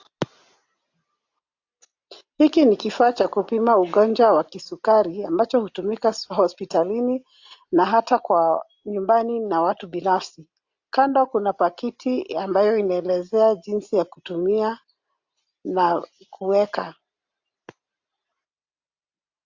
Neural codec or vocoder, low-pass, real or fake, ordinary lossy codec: none; 7.2 kHz; real; AAC, 48 kbps